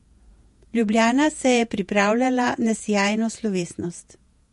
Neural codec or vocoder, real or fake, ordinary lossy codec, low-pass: vocoder, 48 kHz, 128 mel bands, Vocos; fake; MP3, 48 kbps; 14.4 kHz